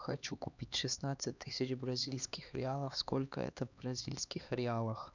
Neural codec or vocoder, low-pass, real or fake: codec, 16 kHz, 2 kbps, X-Codec, HuBERT features, trained on LibriSpeech; 7.2 kHz; fake